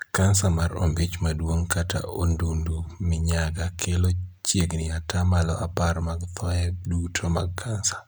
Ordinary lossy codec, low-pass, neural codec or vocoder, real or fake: none; none; none; real